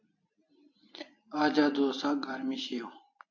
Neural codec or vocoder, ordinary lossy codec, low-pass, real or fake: vocoder, 44.1 kHz, 128 mel bands every 256 samples, BigVGAN v2; AAC, 48 kbps; 7.2 kHz; fake